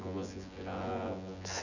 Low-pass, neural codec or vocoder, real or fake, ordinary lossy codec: 7.2 kHz; vocoder, 24 kHz, 100 mel bands, Vocos; fake; none